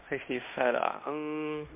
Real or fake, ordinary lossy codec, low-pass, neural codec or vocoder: fake; MP3, 32 kbps; 3.6 kHz; codec, 16 kHz in and 24 kHz out, 0.9 kbps, LongCat-Audio-Codec, fine tuned four codebook decoder